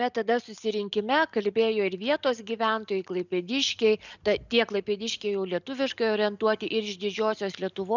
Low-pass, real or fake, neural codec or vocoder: 7.2 kHz; real; none